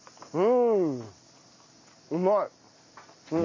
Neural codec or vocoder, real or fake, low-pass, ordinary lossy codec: none; real; 7.2 kHz; MP3, 32 kbps